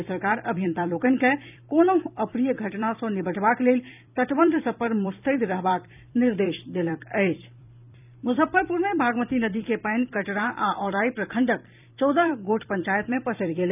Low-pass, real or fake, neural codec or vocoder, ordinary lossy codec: 3.6 kHz; fake; vocoder, 44.1 kHz, 128 mel bands every 256 samples, BigVGAN v2; none